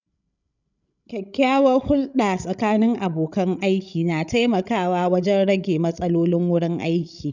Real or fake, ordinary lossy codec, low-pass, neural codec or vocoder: fake; none; 7.2 kHz; codec, 16 kHz, 16 kbps, FreqCodec, larger model